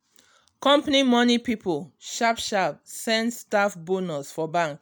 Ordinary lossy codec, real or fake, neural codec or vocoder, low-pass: none; real; none; none